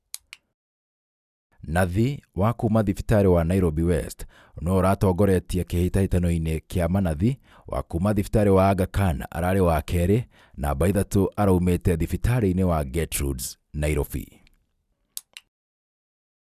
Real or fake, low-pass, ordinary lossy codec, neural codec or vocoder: real; 14.4 kHz; none; none